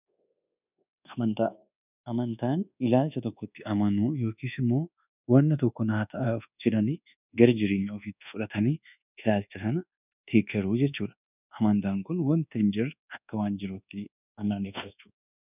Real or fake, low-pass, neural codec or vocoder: fake; 3.6 kHz; codec, 24 kHz, 1.2 kbps, DualCodec